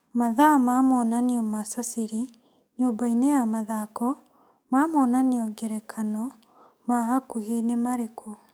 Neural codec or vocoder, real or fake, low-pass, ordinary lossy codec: codec, 44.1 kHz, 7.8 kbps, DAC; fake; none; none